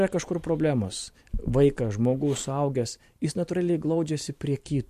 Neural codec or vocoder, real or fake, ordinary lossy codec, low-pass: vocoder, 44.1 kHz, 128 mel bands every 512 samples, BigVGAN v2; fake; MP3, 64 kbps; 14.4 kHz